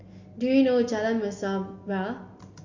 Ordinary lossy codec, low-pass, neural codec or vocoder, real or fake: MP3, 48 kbps; 7.2 kHz; none; real